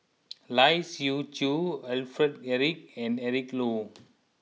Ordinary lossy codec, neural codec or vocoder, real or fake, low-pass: none; none; real; none